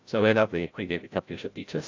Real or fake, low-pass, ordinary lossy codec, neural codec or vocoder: fake; 7.2 kHz; AAC, 48 kbps; codec, 16 kHz, 0.5 kbps, FreqCodec, larger model